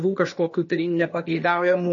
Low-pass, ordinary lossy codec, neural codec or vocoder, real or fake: 7.2 kHz; MP3, 32 kbps; codec, 16 kHz, 0.8 kbps, ZipCodec; fake